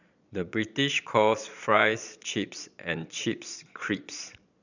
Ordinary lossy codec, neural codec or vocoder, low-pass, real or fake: none; vocoder, 22.05 kHz, 80 mel bands, Vocos; 7.2 kHz; fake